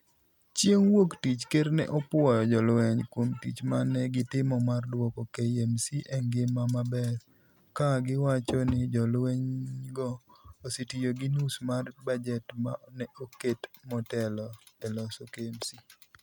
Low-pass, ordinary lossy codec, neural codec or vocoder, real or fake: none; none; none; real